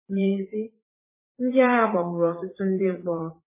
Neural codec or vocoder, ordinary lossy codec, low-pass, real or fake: vocoder, 44.1 kHz, 80 mel bands, Vocos; MP3, 16 kbps; 3.6 kHz; fake